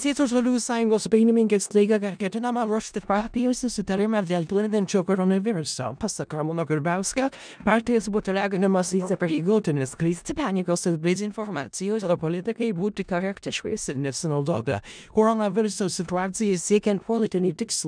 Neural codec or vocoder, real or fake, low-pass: codec, 16 kHz in and 24 kHz out, 0.4 kbps, LongCat-Audio-Codec, four codebook decoder; fake; 9.9 kHz